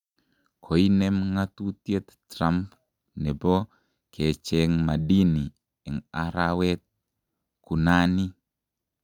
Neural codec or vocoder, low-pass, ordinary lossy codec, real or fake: none; 19.8 kHz; none; real